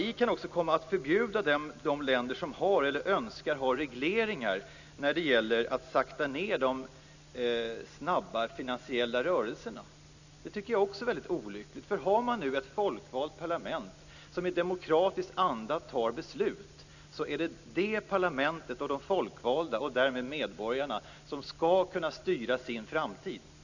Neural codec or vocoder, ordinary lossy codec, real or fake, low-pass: none; none; real; 7.2 kHz